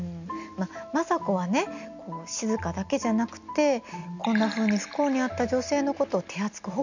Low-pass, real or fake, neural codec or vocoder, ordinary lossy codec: 7.2 kHz; real; none; none